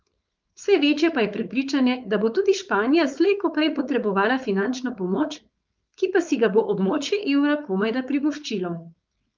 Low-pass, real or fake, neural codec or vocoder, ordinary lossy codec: 7.2 kHz; fake; codec, 16 kHz, 4.8 kbps, FACodec; Opus, 24 kbps